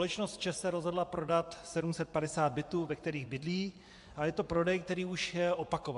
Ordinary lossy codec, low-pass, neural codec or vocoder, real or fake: AAC, 64 kbps; 10.8 kHz; vocoder, 24 kHz, 100 mel bands, Vocos; fake